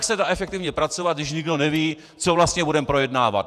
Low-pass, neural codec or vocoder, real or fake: 14.4 kHz; vocoder, 48 kHz, 128 mel bands, Vocos; fake